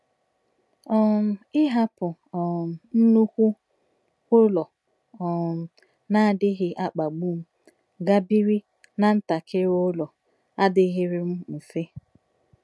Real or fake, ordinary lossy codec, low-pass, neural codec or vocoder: real; none; none; none